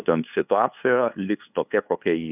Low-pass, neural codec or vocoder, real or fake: 3.6 kHz; codec, 16 kHz, 2 kbps, FunCodec, trained on LibriTTS, 25 frames a second; fake